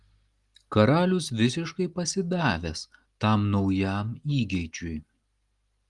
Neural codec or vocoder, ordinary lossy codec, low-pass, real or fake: none; Opus, 24 kbps; 10.8 kHz; real